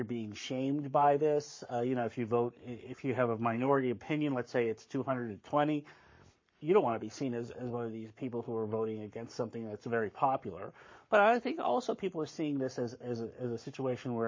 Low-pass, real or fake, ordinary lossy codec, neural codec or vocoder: 7.2 kHz; fake; MP3, 32 kbps; codec, 44.1 kHz, 7.8 kbps, Pupu-Codec